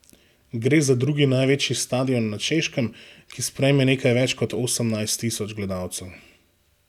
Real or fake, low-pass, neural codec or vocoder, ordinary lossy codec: real; 19.8 kHz; none; none